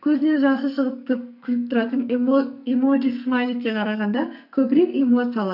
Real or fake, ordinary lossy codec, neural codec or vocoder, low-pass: fake; none; codec, 44.1 kHz, 2.6 kbps, SNAC; 5.4 kHz